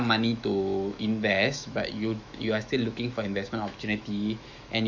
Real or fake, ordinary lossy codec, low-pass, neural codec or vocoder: fake; none; 7.2 kHz; autoencoder, 48 kHz, 128 numbers a frame, DAC-VAE, trained on Japanese speech